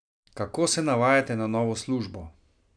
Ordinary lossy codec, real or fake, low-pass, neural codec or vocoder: none; real; 9.9 kHz; none